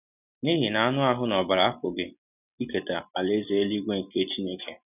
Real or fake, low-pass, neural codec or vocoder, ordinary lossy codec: real; 3.6 kHz; none; none